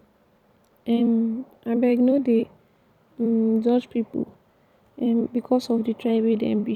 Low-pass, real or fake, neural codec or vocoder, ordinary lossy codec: 19.8 kHz; fake; vocoder, 44.1 kHz, 128 mel bands every 256 samples, BigVGAN v2; none